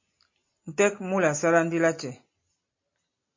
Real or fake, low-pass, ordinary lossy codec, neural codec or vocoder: real; 7.2 kHz; MP3, 32 kbps; none